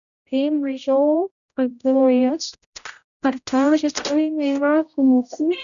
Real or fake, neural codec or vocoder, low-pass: fake; codec, 16 kHz, 0.5 kbps, X-Codec, HuBERT features, trained on general audio; 7.2 kHz